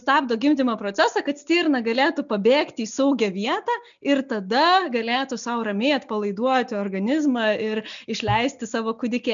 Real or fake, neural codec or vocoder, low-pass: real; none; 7.2 kHz